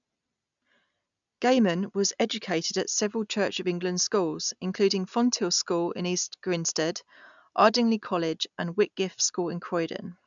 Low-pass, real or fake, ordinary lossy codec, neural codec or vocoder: 7.2 kHz; real; none; none